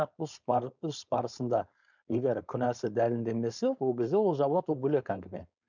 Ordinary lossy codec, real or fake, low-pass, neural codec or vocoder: none; fake; 7.2 kHz; codec, 16 kHz, 4.8 kbps, FACodec